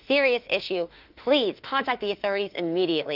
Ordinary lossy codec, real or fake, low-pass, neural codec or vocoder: Opus, 32 kbps; fake; 5.4 kHz; autoencoder, 48 kHz, 32 numbers a frame, DAC-VAE, trained on Japanese speech